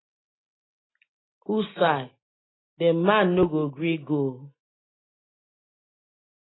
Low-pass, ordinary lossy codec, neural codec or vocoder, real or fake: 7.2 kHz; AAC, 16 kbps; none; real